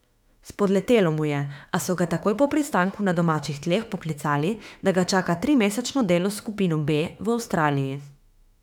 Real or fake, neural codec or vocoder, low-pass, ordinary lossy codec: fake; autoencoder, 48 kHz, 32 numbers a frame, DAC-VAE, trained on Japanese speech; 19.8 kHz; none